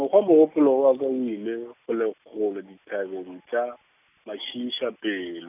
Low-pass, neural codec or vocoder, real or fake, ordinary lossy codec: 3.6 kHz; none; real; none